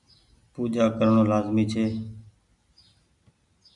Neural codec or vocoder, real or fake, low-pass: none; real; 10.8 kHz